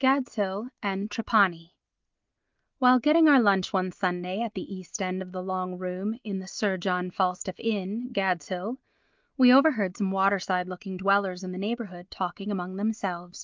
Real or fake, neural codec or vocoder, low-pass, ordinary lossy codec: real; none; 7.2 kHz; Opus, 32 kbps